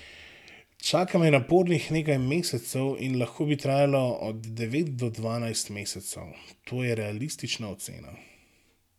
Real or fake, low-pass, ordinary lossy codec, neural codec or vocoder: real; 19.8 kHz; none; none